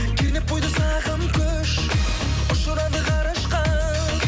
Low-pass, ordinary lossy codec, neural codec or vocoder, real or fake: none; none; none; real